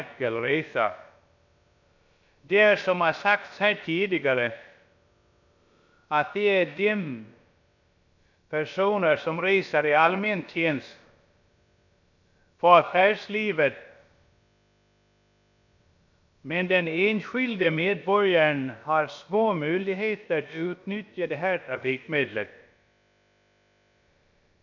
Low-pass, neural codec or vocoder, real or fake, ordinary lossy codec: 7.2 kHz; codec, 16 kHz, about 1 kbps, DyCAST, with the encoder's durations; fake; none